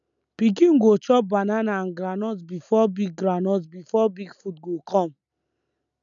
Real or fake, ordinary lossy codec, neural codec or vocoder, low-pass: real; none; none; 7.2 kHz